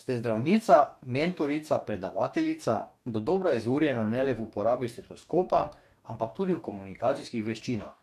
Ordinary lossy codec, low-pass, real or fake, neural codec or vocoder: none; 14.4 kHz; fake; codec, 44.1 kHz, 2.6 kbps, DAC